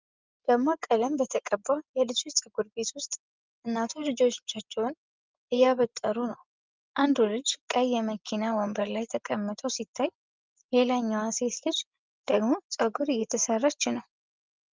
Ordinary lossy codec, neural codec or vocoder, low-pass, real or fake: Opus, 32 kbps; none; 7.2 kHz; real